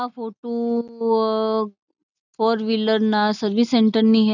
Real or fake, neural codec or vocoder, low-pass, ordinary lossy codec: real; none; 7.2 kHz; none